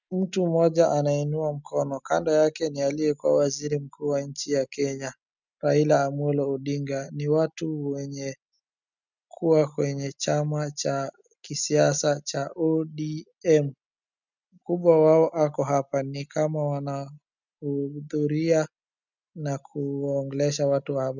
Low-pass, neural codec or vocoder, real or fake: 7.2 kHz; none; real